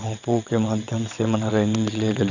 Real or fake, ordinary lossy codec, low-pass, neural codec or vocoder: fake; none; 7.2 kHz; vocoder, 22.05 kHz, 80 mel bands, WaveNeXt